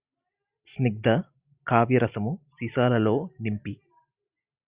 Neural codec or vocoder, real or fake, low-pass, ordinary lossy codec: none; real; 3.6 kHz; Opus, 64 kbps